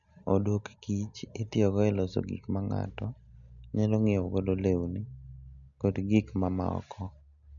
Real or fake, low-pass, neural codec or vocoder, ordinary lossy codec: real; 7.2 kHz; none; none